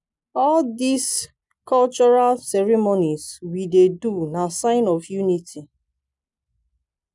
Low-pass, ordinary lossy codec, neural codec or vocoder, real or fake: 10.8 kHz; none; none; real